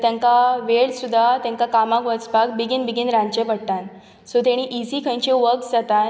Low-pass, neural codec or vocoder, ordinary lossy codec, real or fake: none; none; none; real